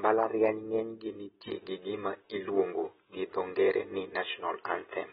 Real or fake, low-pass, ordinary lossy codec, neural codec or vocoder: real; 19.8 kHz; AAC, 16 kbps; none